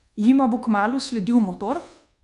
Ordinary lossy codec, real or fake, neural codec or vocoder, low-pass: none; fake; codec, 24 kHz, 1.2 kbps, DualCodec; 10.8 kHz